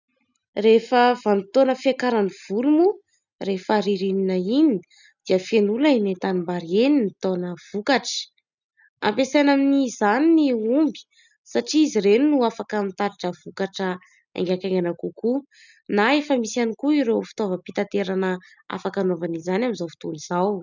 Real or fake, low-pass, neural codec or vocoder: real; 7.2 kHz; none